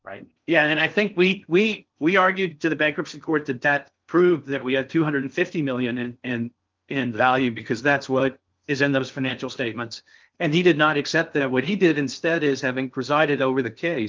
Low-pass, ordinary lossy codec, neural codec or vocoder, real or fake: 7.2 kHz; Opus, 24 kbps; codec, 16 kHz in and 24 kHz out, 0.6 kbps, FocalCodec, streaming, 4096 codes; fake